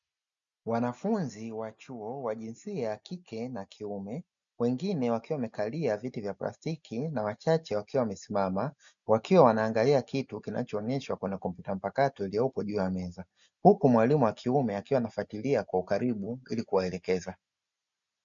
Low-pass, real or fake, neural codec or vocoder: 7.2 kHz; real; none